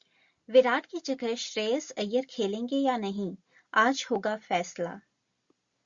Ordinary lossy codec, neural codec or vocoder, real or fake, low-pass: Opus, 64 kbps; none; real; 7.2 kHz